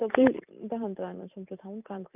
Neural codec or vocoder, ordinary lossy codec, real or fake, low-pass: vocoder, 44.1 kHz, 128 mel bands every 256 samples, BigVGAN v2; none; fake; 3.6 kHz